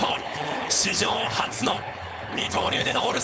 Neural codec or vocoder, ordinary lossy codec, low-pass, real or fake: codec, 16 kHz, 4.8 kbps, FACodec; none; none; fake